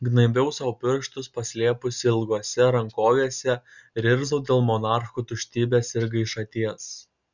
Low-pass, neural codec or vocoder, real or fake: 7.2 kHz; none; real